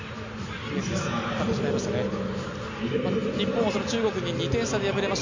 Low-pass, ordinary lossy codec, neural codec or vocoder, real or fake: 7.2 kHz; MP3, 64 kbps; none; real